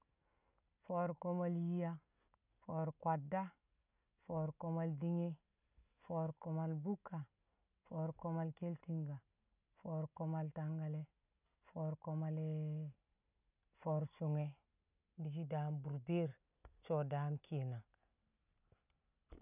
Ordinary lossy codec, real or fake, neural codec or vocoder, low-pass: none; real; none; 3.6 kHz